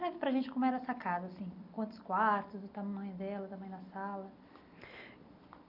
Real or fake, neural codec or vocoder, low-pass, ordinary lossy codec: real; none; 5.4 kHz; none